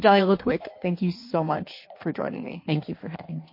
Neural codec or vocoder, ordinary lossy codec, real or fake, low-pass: codec, 16 kHz in and 24 kHz out, 1.1 kbps, FireRedTTS-2 codec; MP3, 32 kbps; fake; 5.4 kHz